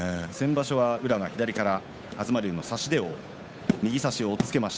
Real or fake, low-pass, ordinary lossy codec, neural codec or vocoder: fake; none; none; codec, 16 kHz, 8 kbps, FunCodec, trained on Chinese and English, 25 frames a second